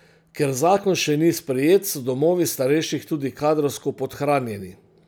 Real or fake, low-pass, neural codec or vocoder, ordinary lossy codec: real; none; none; none